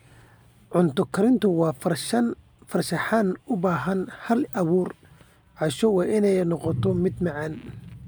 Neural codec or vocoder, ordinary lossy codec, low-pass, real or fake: none; none; none; real